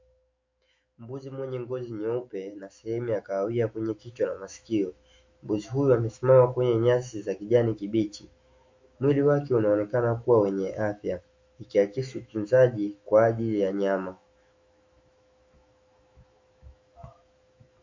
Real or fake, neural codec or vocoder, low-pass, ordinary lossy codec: fake; autoencoder, 48 kHz, 128 numbers a frame, DAC-VAE, trained on Japanese speech; 7.2 kHz; MP3, 48 kbps